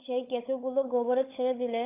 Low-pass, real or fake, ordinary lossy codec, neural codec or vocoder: 3.6 kHz; fake; AAC, 24 kbps; codec, 16 kHz, 16 kbps, FunCodec, trained on LibriTTS, 50 frames a second